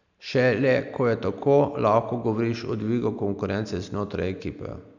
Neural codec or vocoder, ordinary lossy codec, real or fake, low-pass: none; none; real; 7.2 kHz